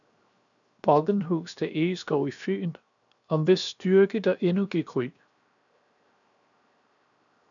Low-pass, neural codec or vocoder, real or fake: 7.2 kHz; codec, 16 kHz, 0.7 kbps, FocalCodec; fake